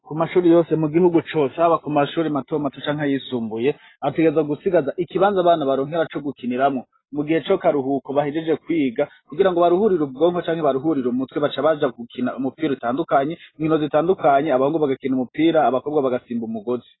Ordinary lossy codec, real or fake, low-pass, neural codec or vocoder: AAC, 16 kbps; real; 7.2 kHz; none